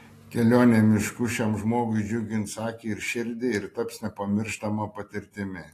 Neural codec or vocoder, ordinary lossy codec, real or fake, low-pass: none; AAC, 48 kbps; real; 14.4 kHz